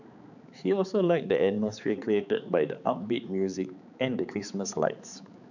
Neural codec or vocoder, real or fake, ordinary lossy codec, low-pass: codec, 16 kHz, 4 kbps, X-Codec, HuBERT features, trained on balanced general audio; fake; none; 7.2 kHz